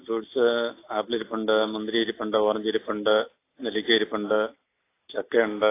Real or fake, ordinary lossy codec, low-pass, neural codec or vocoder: real; AAC, 24 kbps; 3.6 kHz; none